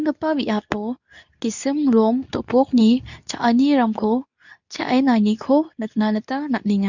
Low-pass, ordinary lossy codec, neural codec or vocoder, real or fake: 7.2 kHz; none; codec, 24 kHz, 0.9 kbps, WavTokenizer, medium speech release version 2; fake